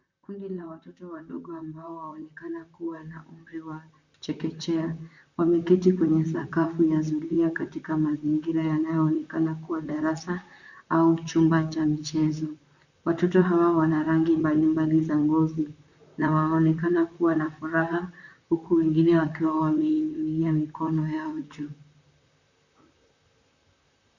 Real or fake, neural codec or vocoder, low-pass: fake; vocoder, 44.1 kHz, 128 mel bands, Pupu-Vocoder; 7.2 kHz